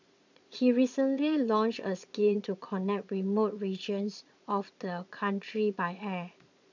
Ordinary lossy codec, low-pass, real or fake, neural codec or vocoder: none; 7.2 kHz; fake; vocoder, 22.05 kHz, 80 mel bands, Vocos